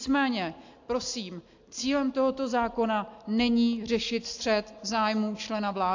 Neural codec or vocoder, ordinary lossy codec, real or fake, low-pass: none; AAC, 48 kbps; real; 7.2 kHz